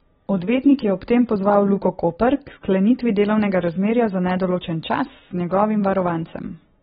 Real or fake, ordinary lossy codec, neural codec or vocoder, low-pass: real; AAC, 16 kbps; none; 19.8 kHz